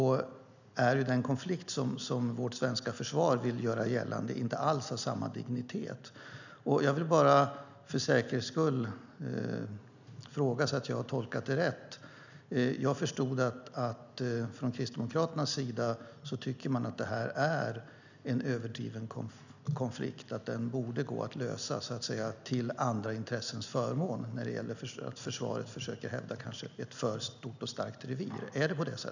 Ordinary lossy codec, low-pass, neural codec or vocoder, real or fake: none; 7.2 kHz; none; real